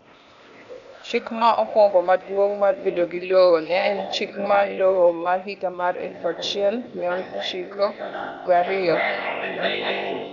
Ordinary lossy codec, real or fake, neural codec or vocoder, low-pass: none; fake; codec, 16 kHz, 0.8 kbps, ZipCodec; 7.2 kHz